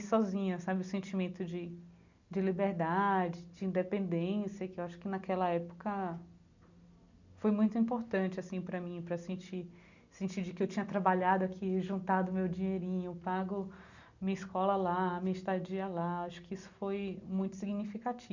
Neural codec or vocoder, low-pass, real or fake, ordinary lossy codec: none; 7.2 kHz; real; none